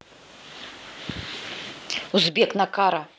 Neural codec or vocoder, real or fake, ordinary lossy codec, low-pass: none; real; none; none